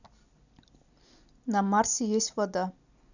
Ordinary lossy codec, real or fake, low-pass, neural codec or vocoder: none; real; 7.2 kHz; none